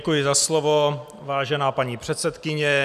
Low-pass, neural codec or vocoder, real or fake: 14.4 kHz; none; real